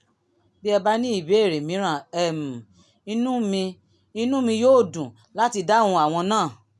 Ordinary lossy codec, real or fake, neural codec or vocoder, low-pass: none; real; none; none